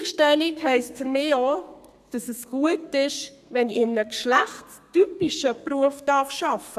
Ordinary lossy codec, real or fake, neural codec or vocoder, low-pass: none; fake; codec, 32 kHz, 1.9 kbps, SNAC; 14.4 kHz